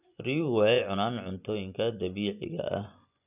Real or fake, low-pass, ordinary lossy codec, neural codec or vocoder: fake; 3.6 kHz; none; vocoder, 24 kHz, 100 mel bands, Vocos